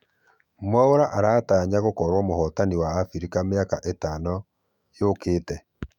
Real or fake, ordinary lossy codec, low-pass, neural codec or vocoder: fake; none; 19.8 kHz; autoencoder, 48 kHz, 128 numbers a frame, DAC-VAE, trained on Japanese speech